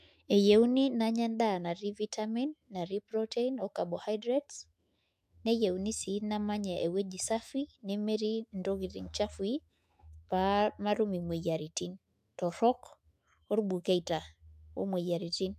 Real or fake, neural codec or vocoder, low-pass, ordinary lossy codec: fake; autoencoder, 48 kHz, 128 numbers a frame, DAC-VAE, trained on Japanese speech; 14.4 kHz; AAC, 96 kbps